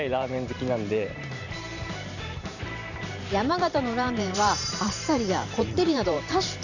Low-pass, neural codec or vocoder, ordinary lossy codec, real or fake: 7.2 kHz; vocoder, 44.1 kHz, 128 mel bands every 512 samples, BigVGAN v2; none; fake